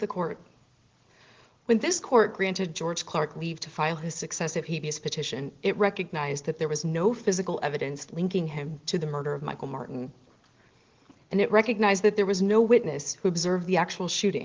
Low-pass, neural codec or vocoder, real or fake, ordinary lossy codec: 7.2 kHz; none; real; Opus, 16 kbps